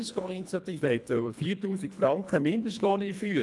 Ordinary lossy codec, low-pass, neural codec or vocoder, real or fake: none; none; codec, 24 kHz, 1.5 kbps, HILCodec; fake